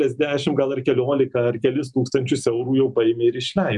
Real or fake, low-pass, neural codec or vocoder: real; 10.8 kHz; none